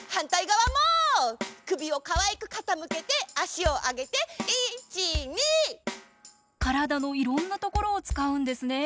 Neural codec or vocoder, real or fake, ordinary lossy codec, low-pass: none; real; none; none